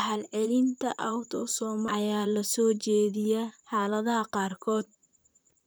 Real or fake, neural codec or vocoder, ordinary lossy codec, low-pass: fake; vocoder, 44.1 kHz, 128 mel bands, Pupu-Vocoder; none; none